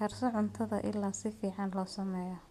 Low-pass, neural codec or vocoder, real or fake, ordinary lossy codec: 14.4 kHz; none; real; MP3, 96 kbps